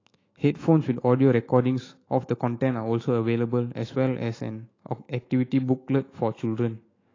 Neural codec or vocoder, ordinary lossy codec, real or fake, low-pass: autoencoder, 48 kHz, 128 numbers a frame, DAC-VAE, trained on Japanese speech; AAC, 32 kbps; fake; 7.2 kHz